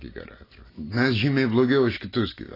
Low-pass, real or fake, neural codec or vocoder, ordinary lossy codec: 5.4 kHz; real; none; AAC, 24 kbps